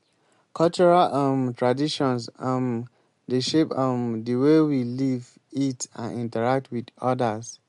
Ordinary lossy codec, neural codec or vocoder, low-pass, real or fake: MP3, 64 kbps; none; 10.8 kHz; real